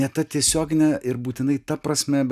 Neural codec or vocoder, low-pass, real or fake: none; 14.4 kHz; real